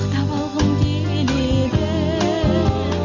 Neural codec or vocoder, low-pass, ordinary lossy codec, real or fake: none; 7.2 kHz; none; real